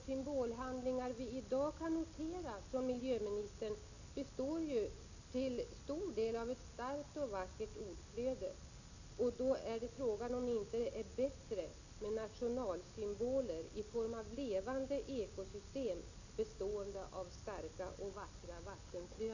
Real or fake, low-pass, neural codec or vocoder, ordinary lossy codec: real; 7.2 kHz; none; none